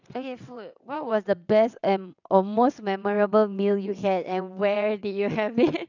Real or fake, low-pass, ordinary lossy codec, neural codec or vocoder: fake; 7.2 kHz; none; vocoder, 22.05 kHz, 80 mel bands, WaveNeXt